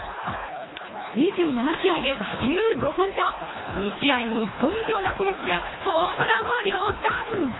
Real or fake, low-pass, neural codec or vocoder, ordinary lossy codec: fake; 7.2 kHz; codec, 24 kHz, 1.5 kbps, HILCodec; AAC, 16 kbps